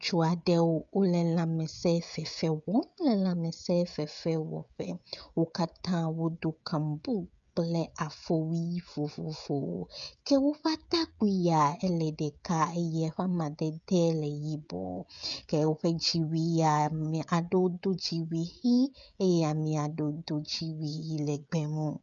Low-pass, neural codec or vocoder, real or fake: 7.2 kHz; codec, 16 kHz, 16 kbps, FreqCodec, smaller model; fake